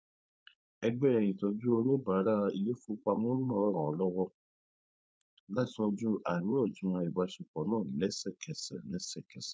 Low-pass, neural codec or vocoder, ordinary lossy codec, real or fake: none; codec, 16 kHz, 4.8 kbps, FACodec; none; fake